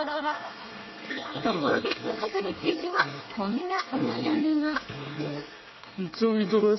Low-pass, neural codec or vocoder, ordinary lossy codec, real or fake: 7.2 kHz; codec, 24 kHz, 1 kbps, SNAC; MP3, 24 kbps; fake